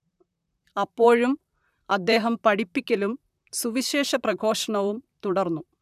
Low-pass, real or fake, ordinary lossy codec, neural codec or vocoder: 14.4 kHz; fake; none; vocoder, 44.1 kHz, 128 mel bands, Pupu-Vocoder